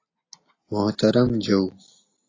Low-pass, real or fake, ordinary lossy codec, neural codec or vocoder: 7.2 kHz; real; AAC, 32 kbps; none